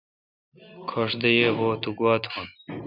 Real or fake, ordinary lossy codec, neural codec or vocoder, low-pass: real; Opus, 64 kbps; none; 5.4 kHz